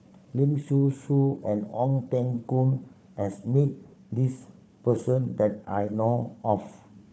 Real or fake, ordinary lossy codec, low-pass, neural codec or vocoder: fake; none; none; codec, 16 kHz, 4 kbps, FunCodec, trained on Chinese and English, 50 frames a second